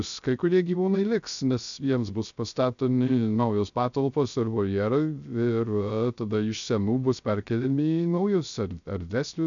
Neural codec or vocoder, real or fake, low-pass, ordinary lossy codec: codec, 16 kHz, 0.3 kbps, FocalCodec; fake; 7.2 kHz; MP3, 96 kbps